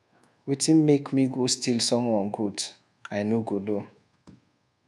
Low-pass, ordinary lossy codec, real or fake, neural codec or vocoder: none; none; fake; codec, 24 kHz, 1.2 kbps, DualCodec